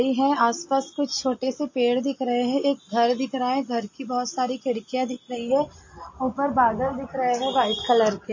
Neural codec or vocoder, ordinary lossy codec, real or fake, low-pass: none; MP3, 32 kbps; real; 7.2 kHz